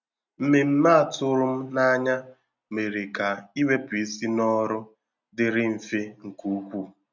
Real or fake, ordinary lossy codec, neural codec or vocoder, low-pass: real; none; none; 7.2 kHz